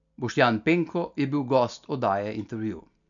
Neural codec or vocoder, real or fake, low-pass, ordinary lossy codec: none; real; 7.2 kHz; none